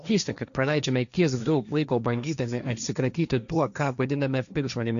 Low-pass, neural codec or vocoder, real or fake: 7.2 kHz; codec, 16 kHz, 1.1 kbps, Voila-Tokenizer; fake